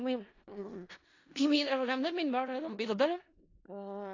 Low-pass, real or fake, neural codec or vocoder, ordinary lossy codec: 7.2 kHz; fake; codec, 16 kHz in and 24 kHz out, 0.4 kbps, LongCat-Audio-Codec, four codebook decoder; AAC, 32 kbps